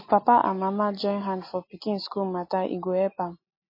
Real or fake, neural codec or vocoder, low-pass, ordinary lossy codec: real; none; 5.4 kHz; MP3, 24 kbps